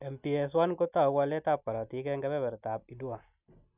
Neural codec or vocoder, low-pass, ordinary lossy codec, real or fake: none; 3.6 kHz; none; real